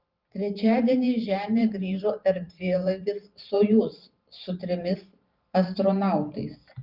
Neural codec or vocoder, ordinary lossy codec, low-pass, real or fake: vocoder, 44.1 kHz, 128 mel bands every 512 samples, BigVGAN v2; Opus, 32 kbps; 5.4 kHz; fake